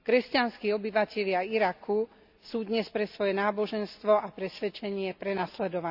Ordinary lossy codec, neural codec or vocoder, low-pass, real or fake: AAC, 48 kbps; none; 5.4 kHz; real